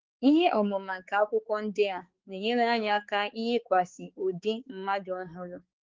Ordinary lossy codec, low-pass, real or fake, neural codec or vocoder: Opus, 16 kbps; 7.2 kHz; fake; codec, 16 kHz, 4 kbps, X-Codec, HuBERT features, trained on balanced general audio